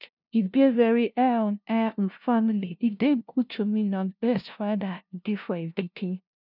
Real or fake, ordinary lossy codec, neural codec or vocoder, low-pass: fake; none; codec, 16 kHz, 0.5 kbps, FunCodec, trained on LibriTTS, 25 frames a second; 5.4 kHz